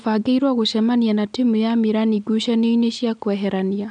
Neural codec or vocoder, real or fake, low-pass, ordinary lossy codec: none; real; 9.9 kHz; none